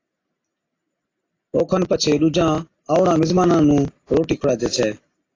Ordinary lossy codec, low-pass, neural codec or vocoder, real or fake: AAC, 32 kbps; 7.2 kHz; none; real